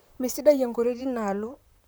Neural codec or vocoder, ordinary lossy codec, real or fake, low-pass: vocoder, 44.1 kHz, 128 mel bands, Pupu-Vocoder; none; fake; none